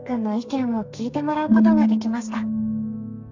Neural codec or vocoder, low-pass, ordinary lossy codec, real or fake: codec, 32 kHz, 1.9 kbps, SNAC; 7.2 kHz; AAC, 48 kbps; fake